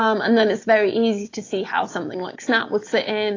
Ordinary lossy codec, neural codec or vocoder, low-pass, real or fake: AAC, 32 kbps; none; 7.2 kHz; real